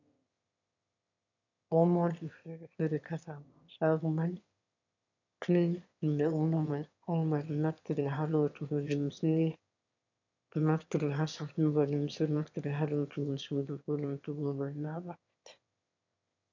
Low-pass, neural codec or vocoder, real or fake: 7.2 kHz; autoencoder, 22.05 kHz, a latent of 192 numbers a frame, VITS, trained on one speaker; fake